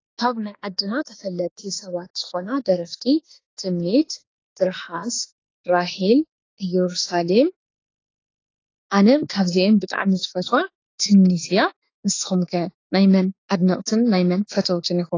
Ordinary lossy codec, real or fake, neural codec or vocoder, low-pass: AAC, 32 kbps; fake; autoencoder, 48 kHz, 32 numbers a frame, DAC-VAE, trained on Japanese speech; 7.2 kHz